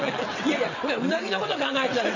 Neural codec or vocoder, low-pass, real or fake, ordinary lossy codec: vocoder, 22.05 kHz, 80 mel bands, WaveNeXt; 7.2 kHz; fake; none